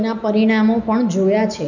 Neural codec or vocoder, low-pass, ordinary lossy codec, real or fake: none; 7.2 kHz; none; real